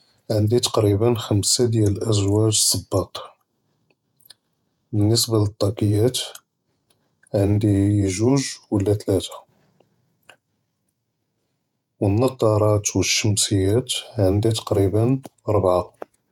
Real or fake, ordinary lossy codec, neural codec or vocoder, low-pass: fake; none; vocoder, 48 kHz, 128 mel bands, Vocos; 19.8 kHz